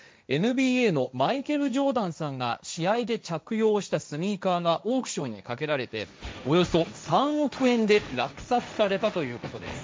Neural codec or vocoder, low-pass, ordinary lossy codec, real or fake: codec, 16 kHz, 1.1 kbps, Voila-Tokenizer; none; none; fake